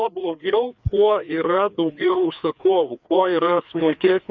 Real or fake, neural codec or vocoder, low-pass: fake; codec, 16 kHz, 2 kbps, FreqCodec, larger model; 7.2 kHz